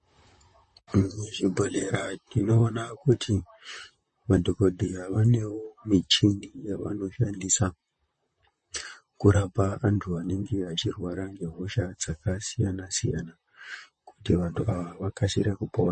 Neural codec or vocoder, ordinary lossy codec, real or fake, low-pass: vocoder, 44.1 kHz, 128 mel bands, Pupu-Vocoder; MP3, 32 kbps; fake; 10.8 kHz